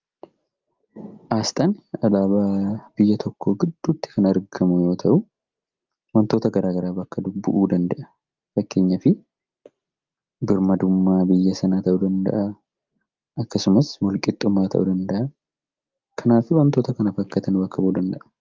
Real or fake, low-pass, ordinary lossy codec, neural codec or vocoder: real; 7.2 kHz; Opus, 24 kbps; none